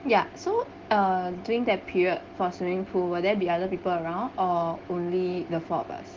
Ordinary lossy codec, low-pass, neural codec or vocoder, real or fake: Opus, 16 kbps; 7.2 kHz; none; real